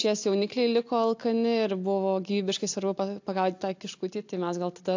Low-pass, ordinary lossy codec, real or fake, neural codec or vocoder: 7.2 kHz; AAC, 48 kbps; real; none